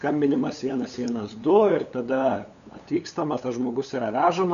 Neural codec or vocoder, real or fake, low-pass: codec, 16 kHz, 8 kbps, FunCodec, trained on LibriTTS, 25 frames a second; fake; 7.2 kHz